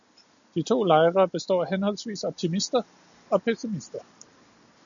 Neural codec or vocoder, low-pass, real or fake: none; 7.2 kHz; real